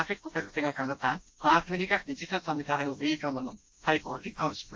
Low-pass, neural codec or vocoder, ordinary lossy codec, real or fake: none; codec, 16 kHz, 1 kbps, FreqCodec, smaller model; none; fake